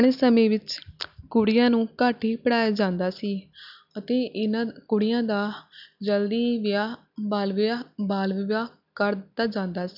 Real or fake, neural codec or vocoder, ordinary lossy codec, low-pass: real; none; none; 5.4 kHz